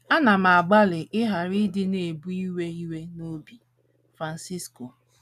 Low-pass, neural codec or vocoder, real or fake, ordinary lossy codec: 14.4 kHz; none; real; none